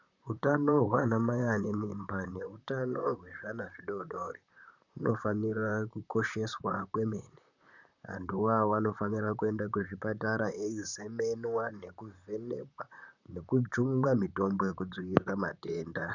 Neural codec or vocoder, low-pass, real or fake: vocoder, 44.1 kHz, 128 mel bands, Pupu-Vocoder; 7.2 kHz; fake